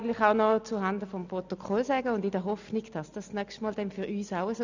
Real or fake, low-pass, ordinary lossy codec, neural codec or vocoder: real; 7.2 kHz; none; none